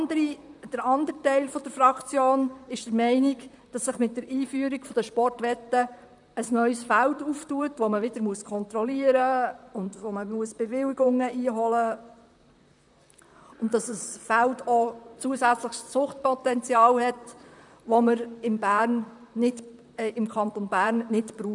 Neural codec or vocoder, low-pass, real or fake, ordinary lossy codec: vocoder, 44.1 kHz, 128 mel bands, Pupu-Vocoder; 10.8 kHz; fake; none